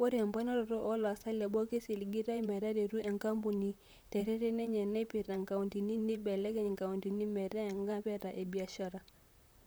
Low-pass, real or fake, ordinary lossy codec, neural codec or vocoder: none; fake; none; vocoder, 44.1 kHz, 128 mel bands, Pupu-Vocoder